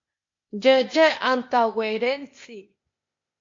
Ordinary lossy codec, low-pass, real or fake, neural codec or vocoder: MP3, 48 kbps; 7.2 kHz; fake; codec, 16 kHz, 0.8 kbps, ZipCodec